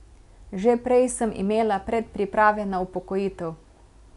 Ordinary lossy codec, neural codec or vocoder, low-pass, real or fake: none; none; 10.8 kHz; real